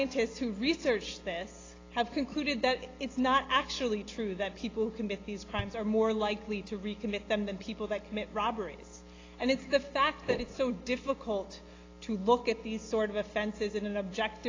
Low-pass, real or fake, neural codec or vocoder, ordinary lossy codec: 7.2 kHz; real; none; AAC, 32 kbps